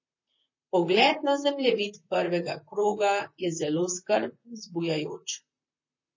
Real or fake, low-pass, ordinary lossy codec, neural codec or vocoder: fake; 7.2 kHz; MP3, 32 kbps; vocoder, 44.1 kHz, 128 mel bands, Pupu-Vocoder